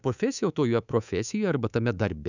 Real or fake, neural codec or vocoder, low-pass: fake; codec, 24 kHz, 3.1 kbps, DualCodec; 7.2 kHz